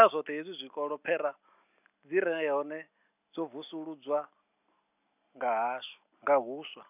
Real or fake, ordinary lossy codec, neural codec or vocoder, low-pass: real; none; none; 3.6 kHz